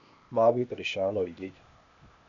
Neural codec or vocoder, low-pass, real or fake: codec, 16 kHz, 0.8 kbps, ZipCodec; 7.2 kHz; fake